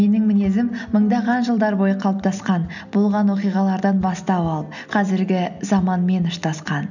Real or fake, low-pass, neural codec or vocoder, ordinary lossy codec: real; 7.2 kHz; none; none